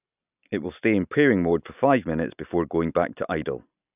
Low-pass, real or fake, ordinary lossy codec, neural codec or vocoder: 3.6 kHz; real; none; none